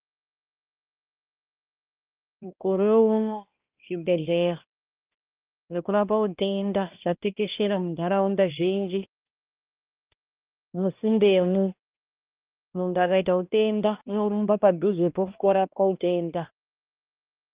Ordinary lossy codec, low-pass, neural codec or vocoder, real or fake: Opus, 32 kbps; 3.6 kHz; codec, 16 kHz, 1 kbps, X-Codec, HuBERT features, trained on balanced general audio; fake